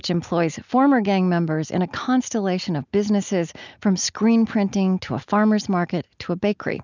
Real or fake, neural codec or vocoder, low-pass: real; none; 7.2 kHz